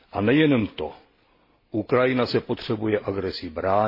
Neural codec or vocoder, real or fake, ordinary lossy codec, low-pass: none; real; MP3, 24 kbps; 5.4 kHz